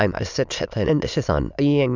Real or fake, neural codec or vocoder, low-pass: fake; autoencoder, 22.05 kHz, a latent of 192 numbers a frame, VITS, trained on many speakers; 7.2 kHz